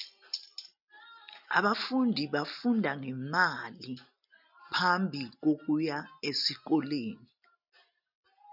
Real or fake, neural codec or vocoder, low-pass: real; none; 5.4 kHz